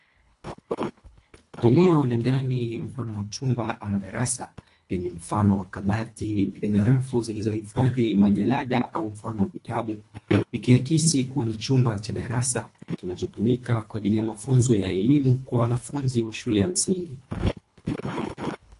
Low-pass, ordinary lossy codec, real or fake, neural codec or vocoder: 10.8 kHz; AAC, 48 kbps; fake; codec, 24 kHz, 1.5 kbps, HILCodec